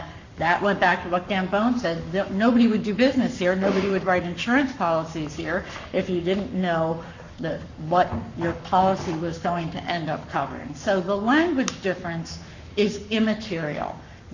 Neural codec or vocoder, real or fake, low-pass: codec, 44.1 kHz, 7.8 kbps, Pupu-Codec; fake; 7.2 kHz